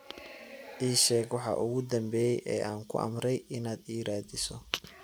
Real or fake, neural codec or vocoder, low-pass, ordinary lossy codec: real; none; none; none